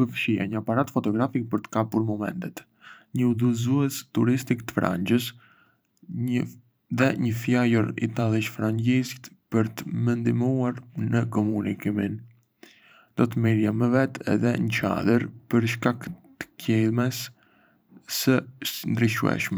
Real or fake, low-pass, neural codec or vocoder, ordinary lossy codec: fake; none; vocoder, 44.1 kHz, 128 mel bands every 512 samples, BigVGAN v2; none